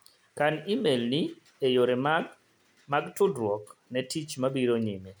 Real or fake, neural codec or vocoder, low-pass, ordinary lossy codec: real; none; none; none